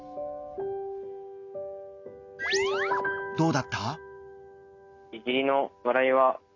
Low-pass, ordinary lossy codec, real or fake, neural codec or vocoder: 7.2 kHz; none; real; none